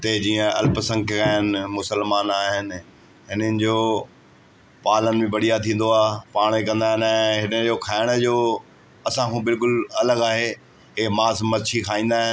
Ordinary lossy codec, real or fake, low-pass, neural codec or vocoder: none; real; none; none